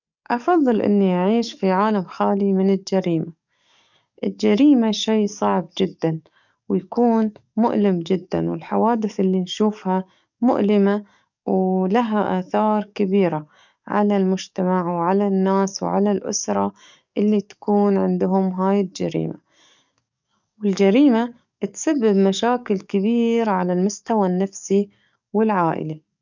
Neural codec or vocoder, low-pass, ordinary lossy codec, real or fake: codec, 44.1 kHz, 7.8 kbps, DAC; 7.2 kHz; none; fake